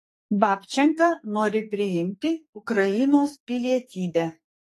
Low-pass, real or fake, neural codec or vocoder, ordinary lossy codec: 14.4 kHz; fake; codec, 44.1 kHz, 2.6 kbps, SNAC; AAC, 48 kbps